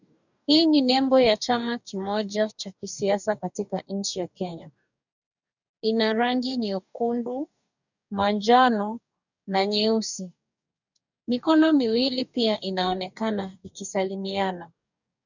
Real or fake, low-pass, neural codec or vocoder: fake; 7.2 kHz; codec, 44.1 kHz, 2.6 kbps, DAC